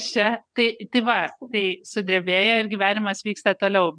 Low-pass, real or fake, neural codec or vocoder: 9.9 kHz; fake; vocoder, 22.05 kHz, 80 mel bands, WaveNeXt